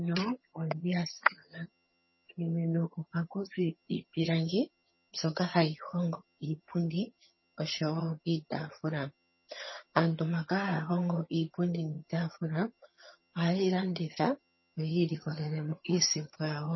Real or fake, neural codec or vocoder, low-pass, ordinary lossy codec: fake; vocoder, 22.05 kHz, 80 mel bands, HiFi-GAN; 7.2 kHz; MP3, 24 kbps